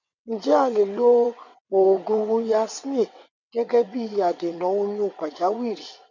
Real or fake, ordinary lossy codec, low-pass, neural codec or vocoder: fake; none; 7.2 kHz; vocoder, 22.05 kHz, 80 mel bands, WaveNeXt